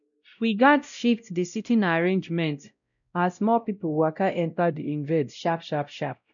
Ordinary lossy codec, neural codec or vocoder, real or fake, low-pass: none; codec, 16 kHz, 0.5 kbps, X-Codec, WavLM features, trained on Multilingual LibriSpeech; fake; 7.2 kHz